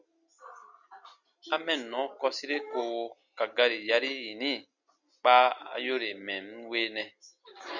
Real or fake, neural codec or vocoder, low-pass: real; none; 7.2 kHz